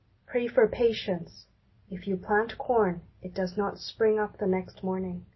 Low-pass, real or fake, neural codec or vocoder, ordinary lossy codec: 7.2 kHz; real; none; MP3, 24 kbps